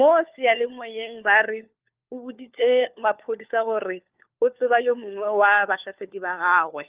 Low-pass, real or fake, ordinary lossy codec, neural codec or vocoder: 3.6 kHz; fake; Opus, 32 kbps; codec, 16 kHz, 4 kbps, FunCodec, trained on LibriTTS, 50 frames a second